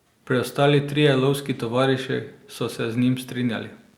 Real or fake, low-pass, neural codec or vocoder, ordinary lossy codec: real; 19.8 kHz; none; Opus, 64 kbps